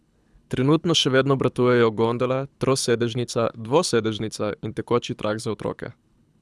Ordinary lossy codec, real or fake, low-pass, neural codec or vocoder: none; fake; none; codec, 24 kHz, 6 kbps, HILCodec